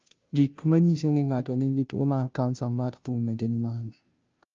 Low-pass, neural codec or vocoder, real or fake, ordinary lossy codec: 7.2 kHz; codec, 16 kHz, 0.5 kbps, FunCodec, trained on Chinese and English, 25 frames a second; fake; Opus, 24 kbps